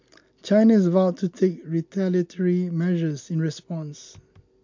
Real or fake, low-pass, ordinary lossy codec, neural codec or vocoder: real; 7.2 kHz; MP3, 48 kbps; none